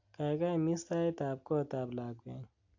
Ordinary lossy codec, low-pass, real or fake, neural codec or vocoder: AAC, 48 kbps; 7.2 kHz; real; none